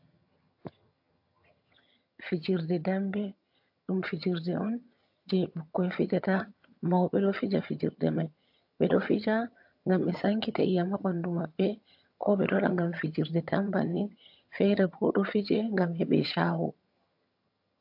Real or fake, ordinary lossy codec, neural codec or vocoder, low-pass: fake; AAC, 48 kbps; vocoder, 22.05 kHz, 80 mel bands, HiFi-GAN; 5.4 kHz